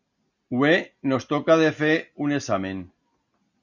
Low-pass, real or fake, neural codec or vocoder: 7.2 kHz; real; none